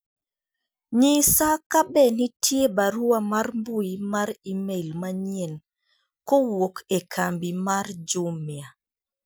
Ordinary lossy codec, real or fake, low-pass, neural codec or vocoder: none; real; none; none